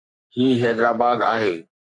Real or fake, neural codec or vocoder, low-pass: fake; codec, 44.1 kHz, 3.4 kbps, Pupu-Codec; 10.8 kHz